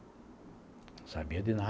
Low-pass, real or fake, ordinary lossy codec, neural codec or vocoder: none; real; none; none